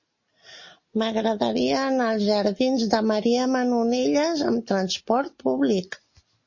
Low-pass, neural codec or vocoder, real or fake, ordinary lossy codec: 7.2 kHz; none; real; MP3, 32 kbps